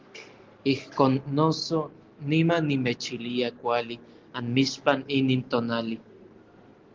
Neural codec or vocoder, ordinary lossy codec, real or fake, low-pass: none; Opus, 16 kbps; real; 7.2 kHz